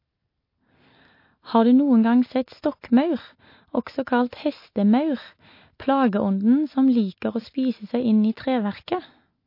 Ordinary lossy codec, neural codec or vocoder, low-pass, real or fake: MP3, 32 kbps; none; 5.4 kHz; real